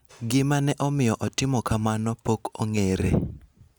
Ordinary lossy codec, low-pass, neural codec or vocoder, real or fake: none; none; none; real